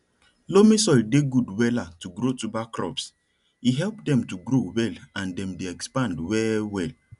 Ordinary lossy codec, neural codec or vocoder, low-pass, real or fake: none; none; 10.8 kHz; real